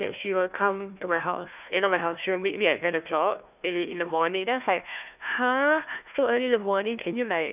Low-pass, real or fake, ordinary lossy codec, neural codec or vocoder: 3.6 kHz; fake; none; codec, 16 kHz, 1 kbps, FunCodec, trained on Chinese and English, 50 frames a second